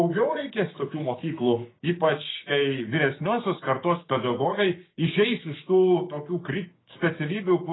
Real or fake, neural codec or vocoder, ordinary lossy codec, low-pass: fake; codec, 16 kHz, 6 kbps, DAC; AAC, 16 kbps; 7.2 kHz